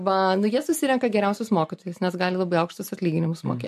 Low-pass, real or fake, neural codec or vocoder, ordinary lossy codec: 14.4 kHz; real; none; MP3, 64 kbps